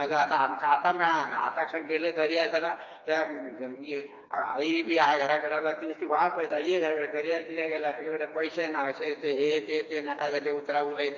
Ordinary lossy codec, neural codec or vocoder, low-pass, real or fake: AAC, 48 kbps; codec, 16 kHz, 2 kbps, FreqCodec, smaller model; 7.2 kHz; fake